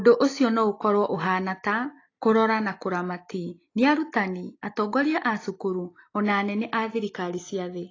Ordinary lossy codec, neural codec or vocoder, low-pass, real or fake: AAC, 32 kbps; none; 7.2 kHz; real